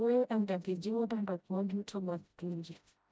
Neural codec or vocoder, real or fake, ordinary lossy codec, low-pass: codec, 16 kHz, 0.5 kbps, FreqCodec, smaller model; fake; none; none